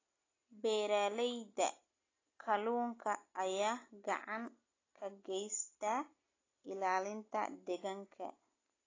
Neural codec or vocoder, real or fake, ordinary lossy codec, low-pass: none; real; AAC, 32 kbps; 7.2 kHz